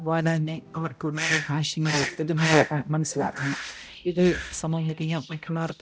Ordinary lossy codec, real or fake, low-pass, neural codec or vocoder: none; fake; none; codec, 16 kHz, 0.5 kbps, X-Codec, HuBERT features, trained on balanced general audio